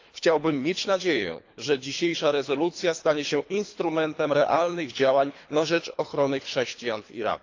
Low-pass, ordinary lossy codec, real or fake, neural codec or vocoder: 7.2 kHz; AAC, 48 kbps; fake; codec, 24 kHz, 3 kbps, HILCodec